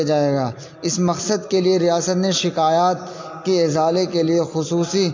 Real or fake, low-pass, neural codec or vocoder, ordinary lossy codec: real; 7.2 kHz; none; MP3, 48 kbps